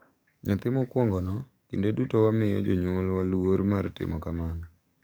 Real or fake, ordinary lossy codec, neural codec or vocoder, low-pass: fake; none; codec, 44.1 kHz, 7.8 kbps, DAC; none